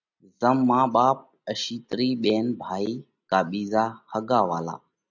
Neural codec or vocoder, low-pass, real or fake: none; 7.2 kHz; real